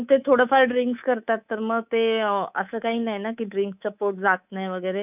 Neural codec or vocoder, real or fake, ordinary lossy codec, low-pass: codec, 24 kHz, 3.1 kbps, DualCodec; fake; none; 3.6 kHz